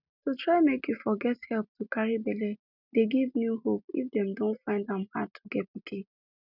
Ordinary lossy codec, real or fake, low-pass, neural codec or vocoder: none; real; 5.4 kHz; none